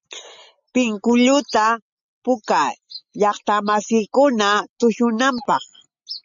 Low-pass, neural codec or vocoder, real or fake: 7.2 kHz; none; real